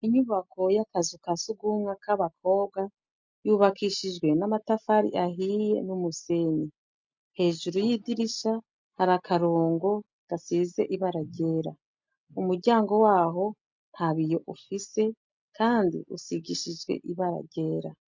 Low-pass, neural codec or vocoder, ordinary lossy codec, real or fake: 7.2 kHz; none; MP3, 64 kbps; real